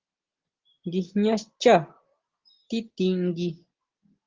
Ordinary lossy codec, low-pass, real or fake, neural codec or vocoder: Opus, 16 kbps; 7.2 kHz; real; none